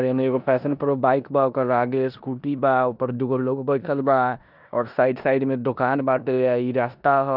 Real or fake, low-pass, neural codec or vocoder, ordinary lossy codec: fake; 5.4 kHz; codec, 16 kHz in and 24 kHz out, 0.9 kbps, LongCat-Audio-Codec, four codebook decoder; none